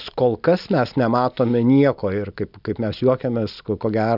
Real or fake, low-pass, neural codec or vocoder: real; 5.4 kHz; none